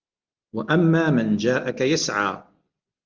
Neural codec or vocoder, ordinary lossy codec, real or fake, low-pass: none; Opus, 32 kbps; real; 7.2 kHz